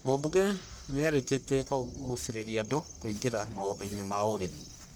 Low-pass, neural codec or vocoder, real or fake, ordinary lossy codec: none; codec, 44.1 kHz, 1.7 kbps, Pupu-Codec; fake; none